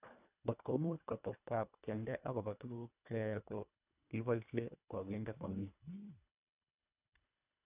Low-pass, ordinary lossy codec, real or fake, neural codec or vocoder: 3.6 kHz; none; fake; codec, 24 kHz, 1.5 kbps, HILCodec